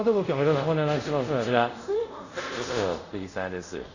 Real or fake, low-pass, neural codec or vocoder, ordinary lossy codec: fake; 7.2 kHz; codec, 24 kHz, 0.5 kbps, DualCodec; none